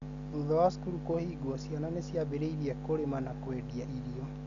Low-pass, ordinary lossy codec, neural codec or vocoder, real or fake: 7.2 kHz; none; none; real